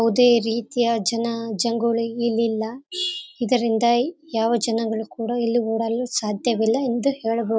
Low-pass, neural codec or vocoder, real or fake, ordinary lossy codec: none; none; real; none